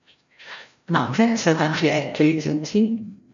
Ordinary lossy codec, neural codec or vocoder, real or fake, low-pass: AAC, 64 kbps; codec, 16 kHz, 0.5 kbps, FreqCodec, larger model; fake; 7.2 kHz